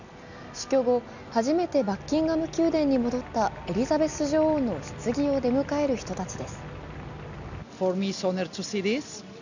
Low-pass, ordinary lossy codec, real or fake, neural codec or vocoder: 7.2 kHz; none; real; none